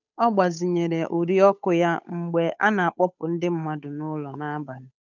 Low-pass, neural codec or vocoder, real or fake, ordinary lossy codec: 7.2 kHz; codec, 16 kHz, 8 kbps, FunCodec, trained on Chinese and English, 25 frames a second; fake; none